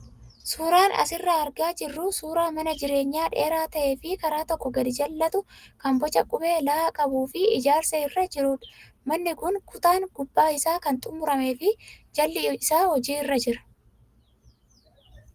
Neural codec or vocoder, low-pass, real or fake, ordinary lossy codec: none; 14.4 kHz; real; Opus, 24 kbps